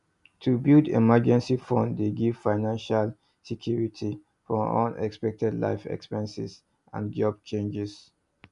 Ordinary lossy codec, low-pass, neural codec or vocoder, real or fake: none; 10.8 kHz; none; real